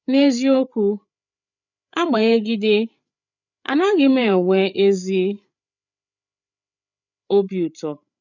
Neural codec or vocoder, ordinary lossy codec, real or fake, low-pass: codec, 16 kHz, 8 kbps, FreqCodec, larger model; none; fake; 7.2 kHz